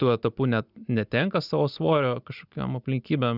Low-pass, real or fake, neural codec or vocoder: 5.4 kHz; real; none